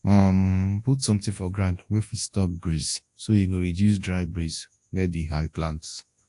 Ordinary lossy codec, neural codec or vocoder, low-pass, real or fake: AAC, 48 kbps; codec, 24 kHz, 0.9 kbps, WavTokenizer, large speech release; 10.8 kHz; fake